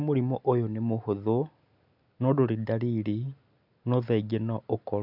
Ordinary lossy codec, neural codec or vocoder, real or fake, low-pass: none; none; real; 5.4 kHz